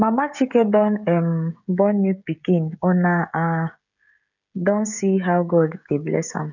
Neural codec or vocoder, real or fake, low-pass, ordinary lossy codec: codec, 16 kHz, 16 kbps, FreqCodec, smaller model; fake; 7.2 kHz; none